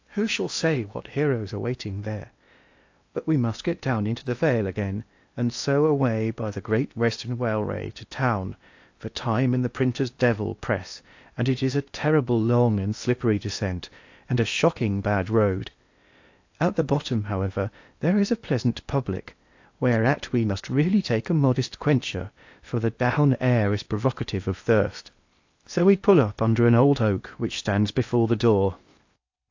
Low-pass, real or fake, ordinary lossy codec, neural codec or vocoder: 7.2 kHz; fake; AAC, 48 kbps; codec, 16 kHz in and 24 kHz out, 0.8 kbps, FocalCodec, streaming, 65536 codes